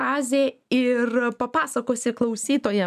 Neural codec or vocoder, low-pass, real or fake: none; 14.4 kHz; real